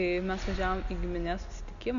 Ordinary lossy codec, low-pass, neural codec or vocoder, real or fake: AAC, 96 kbps; 7.2 kHz; none; real